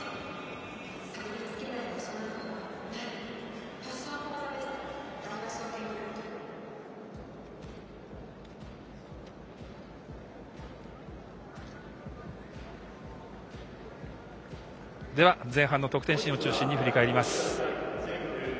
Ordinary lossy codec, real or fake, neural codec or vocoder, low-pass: none; real; none; none